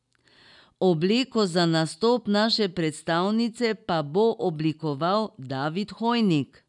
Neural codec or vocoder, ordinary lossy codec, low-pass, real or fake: none; none; 10.8 kHz; real